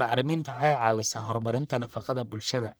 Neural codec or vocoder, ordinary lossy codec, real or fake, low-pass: codec, 44.1 kHz, 1.7 kbps, Pupu-Codec; none; fake; none